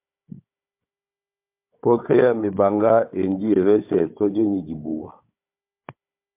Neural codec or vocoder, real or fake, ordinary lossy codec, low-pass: codec, 16 kHz, 4 kbps, FunCodec, trained on Chinese and English, 50 frames a second; fake; MP3, 32 kbps; 3.6 kHz